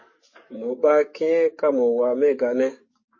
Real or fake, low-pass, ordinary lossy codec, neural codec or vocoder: fake; 7.2 kHz; MP3, 32 kbps; codec, 44.1 kHz, 7.8 kbps, DAC